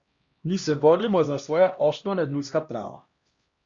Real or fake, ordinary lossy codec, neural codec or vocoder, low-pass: fake; Opus, 64 kbps; codec, 16 kHz, 1 kbps, X-Codec, HuBERT features, trained on LibriSpeech; 7.2 kHz